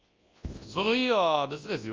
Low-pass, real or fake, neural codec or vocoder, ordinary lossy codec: 7.2 kHz; fake; codec, 24 kHz, 0.9 kbps, WavTokenizer, large speech release; Opus, 32 kbps